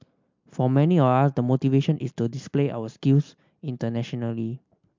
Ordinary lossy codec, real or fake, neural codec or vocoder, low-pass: MP3, 64 kbps; real; none; 7.2 kHz